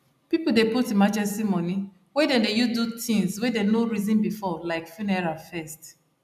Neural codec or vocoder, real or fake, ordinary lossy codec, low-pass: none; real; none; 14.4 kHz